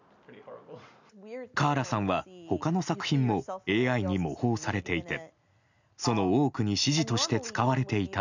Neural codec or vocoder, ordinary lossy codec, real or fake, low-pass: none; none; real; 7.2 kHz